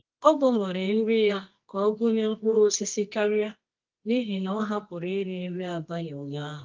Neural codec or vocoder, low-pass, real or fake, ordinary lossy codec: codec, 24 kHz, 0.9 kbps, WavTokenizer, medium music audio release; 7.2 kHz; fake; Opus, 32 kbps